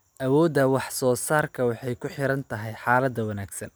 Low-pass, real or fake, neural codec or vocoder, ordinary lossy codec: none; real; none; none